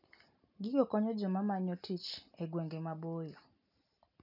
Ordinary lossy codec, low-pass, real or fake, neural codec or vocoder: none; 5.4 kHz; real; none